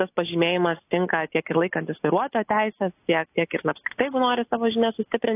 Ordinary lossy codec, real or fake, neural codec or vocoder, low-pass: AAC, 24 kbps; real; none; 3.6 kHz